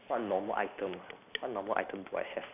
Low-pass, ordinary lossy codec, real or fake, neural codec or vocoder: 3.6 kHz; none; real; none